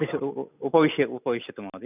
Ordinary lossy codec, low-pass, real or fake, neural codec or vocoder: none; 3.6 kHz; real; none